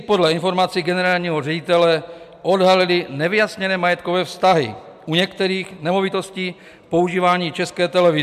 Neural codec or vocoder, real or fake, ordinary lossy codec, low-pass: none; real; MP3, 96 kbps; 14.4 kHz